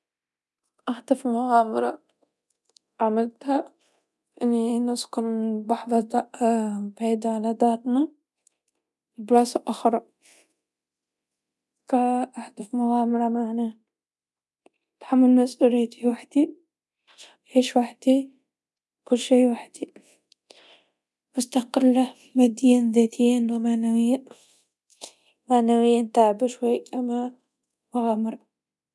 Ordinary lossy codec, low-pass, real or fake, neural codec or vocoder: none; none; fake; codec, 24 kHz, 0.9 kbps, DualCodec